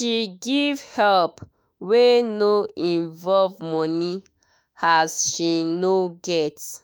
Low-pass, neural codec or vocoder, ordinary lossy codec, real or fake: none; autoencoder, 48 kHz, 32 numbers a frame, DAC-VAE, trained on Japanese speech; none; fake